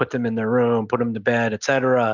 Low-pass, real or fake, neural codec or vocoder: 7.2 kHz; real; none